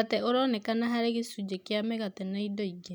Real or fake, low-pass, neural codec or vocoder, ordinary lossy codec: real; none; none; none